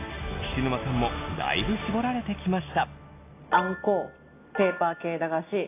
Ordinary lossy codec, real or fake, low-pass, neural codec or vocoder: AAC, 24 kbps; real; 3.6 kHz; none